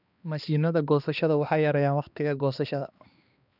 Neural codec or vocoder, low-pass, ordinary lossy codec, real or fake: codec, 16 kHz, 2 kbps, X-Codec, HuBERT features, trained on balanced general audio; 5.4 kHz; none; fake